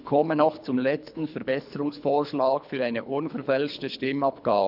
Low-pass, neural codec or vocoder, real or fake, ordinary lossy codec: 5.4 kHz; codec, 24 kHz, 3 kbps, HILCodec; fake; none